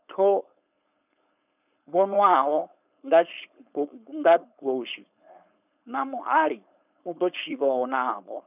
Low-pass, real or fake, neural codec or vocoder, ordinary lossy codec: 3.6 kHz; fake; codec, 16 kHz, 4.8 kbps, FACodec; none